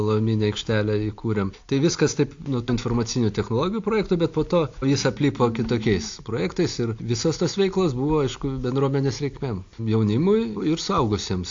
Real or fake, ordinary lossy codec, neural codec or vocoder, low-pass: real; AAC, 48 kbps; none; 7.2 kHz